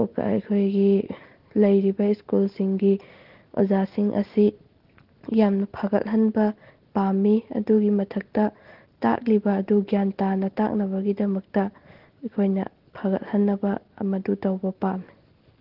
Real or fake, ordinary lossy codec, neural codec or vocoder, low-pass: real; Opus, 16 kbps; none; 5.4 kHz